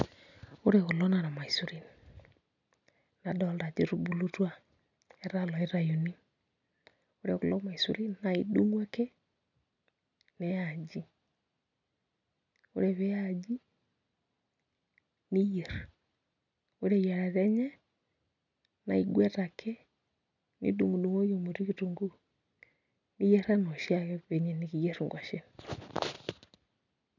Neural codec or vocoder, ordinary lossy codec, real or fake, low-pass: none; none; real; 7.2 kHz